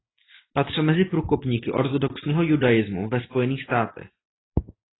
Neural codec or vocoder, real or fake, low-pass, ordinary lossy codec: none; real; 7.2 kHz; AAC, 16 kbps